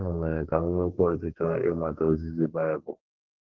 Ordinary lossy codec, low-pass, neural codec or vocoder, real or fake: Opus, 16 kbps; 7.2 kHz; codec, 32 kHz, 1.9 kbps, SNAC; fake